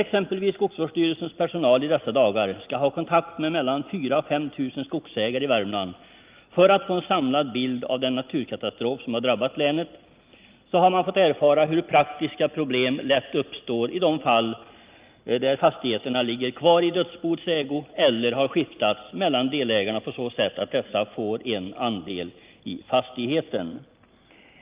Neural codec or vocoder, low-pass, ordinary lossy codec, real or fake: none; 3.6 kHz; Opus, 32 kbps; real